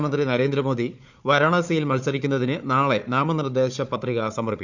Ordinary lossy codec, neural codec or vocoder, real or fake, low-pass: none; codec, 16 kHz, 4 kbps, FunCodec, trained on Chinese and English, 50 frames a second; fake; 7.2 kHz